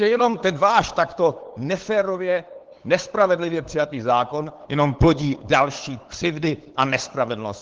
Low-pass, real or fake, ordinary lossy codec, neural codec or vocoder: 7.2 kHz; fake; Opus, 16 kbps; codec, 16 kHz, 8 kbps, FunCodec, trained on LibriTTS, 25 frames a second